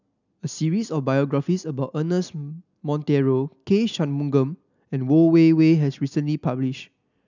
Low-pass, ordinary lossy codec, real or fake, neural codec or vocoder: 7.2 kHz; none; real; none